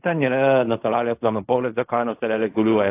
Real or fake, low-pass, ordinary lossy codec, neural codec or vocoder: fake; 3.6 kHz; AAC, 24 kbps; codec, 16 kHz in and 24 kHz out, 0.4 kbps, LongCat-Audio-Codec, fine tuned four codebook decoder